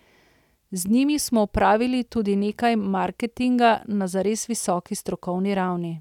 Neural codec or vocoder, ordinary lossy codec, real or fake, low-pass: none; none; real; 19.8 kHz